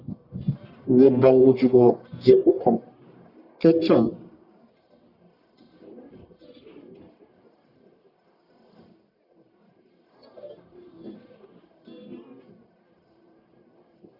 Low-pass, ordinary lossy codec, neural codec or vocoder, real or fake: 5.4 kHz; Opus, 64 kbps; codec, 44.1 kHz, 1.7 kbps, Pupu-Codec; fake